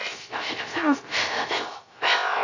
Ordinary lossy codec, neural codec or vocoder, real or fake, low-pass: AAC, 48 kbps; codec, 16 kHz, 0.3 kbps, FocalCodec; fake; 7.2 kHz